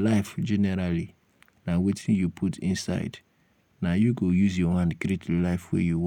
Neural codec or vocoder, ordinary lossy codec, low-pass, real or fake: none; none; 19.8 kHz; real